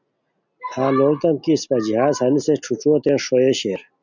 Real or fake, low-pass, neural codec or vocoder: real; 7.2 kHz; none